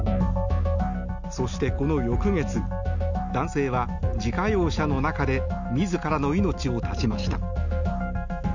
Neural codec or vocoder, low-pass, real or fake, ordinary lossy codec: none; 7.2 kHz; real; none